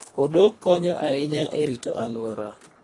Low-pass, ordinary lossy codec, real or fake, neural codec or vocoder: 10.8 kHz; AAC, 32 kbps; fake; codec, 24 kHz, 1.5 kbps, HILCodec